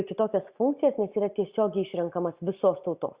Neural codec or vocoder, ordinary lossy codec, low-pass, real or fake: none; Opus, 64 kbps; 3.6 kHz; real